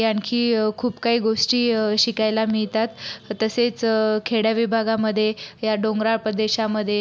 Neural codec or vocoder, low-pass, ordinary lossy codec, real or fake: none; none; none; real